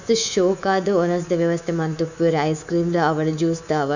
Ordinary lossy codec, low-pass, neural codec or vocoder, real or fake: none; 7.2 kHz; codec, 24 kHz, 3.1 kbps, DualCodec; fake